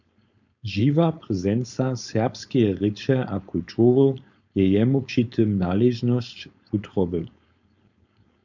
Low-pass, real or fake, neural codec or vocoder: 7.2 kHz; fake; codec, 16 kHz, 4.8 kbps, FACodec